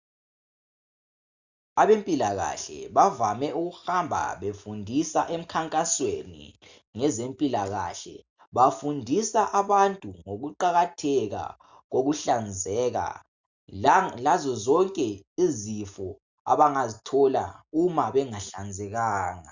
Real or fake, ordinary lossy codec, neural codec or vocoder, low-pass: real; Opus, 64 kbps; none; 7.2 kHz